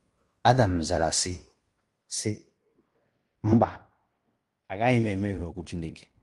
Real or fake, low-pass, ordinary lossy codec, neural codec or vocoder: fake; 10.8 kHz; Opus, 32 kbps; codec, 16 kHz in and 24 kHz out, 0.9 kbps, LongCat-Audio-Codec, fine tuned four codebook decoder